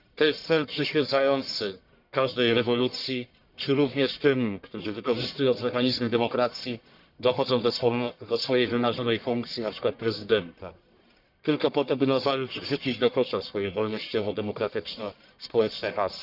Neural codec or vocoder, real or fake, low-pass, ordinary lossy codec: codec, 44.1 kHz, 1.7 kbps, Pupu-Codec; fake; 5.4 kHz; none